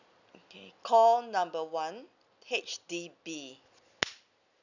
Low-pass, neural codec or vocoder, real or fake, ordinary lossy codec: 7.2 kHz; none; real; none